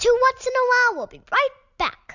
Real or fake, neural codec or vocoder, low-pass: real; none; 7.2 kHz